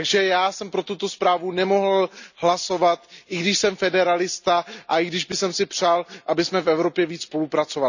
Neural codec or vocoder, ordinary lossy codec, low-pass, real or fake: none; none; 7.2 kHz; real